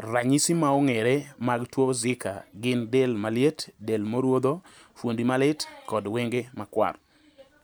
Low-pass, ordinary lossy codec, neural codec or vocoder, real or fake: none; none; none; real